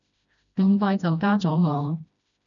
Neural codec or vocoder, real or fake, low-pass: codec, 16 kHz, 1 kbps, FreqCodec, smaller model; fake; 7.2 kHz